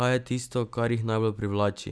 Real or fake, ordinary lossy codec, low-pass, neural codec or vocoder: real; none; none; none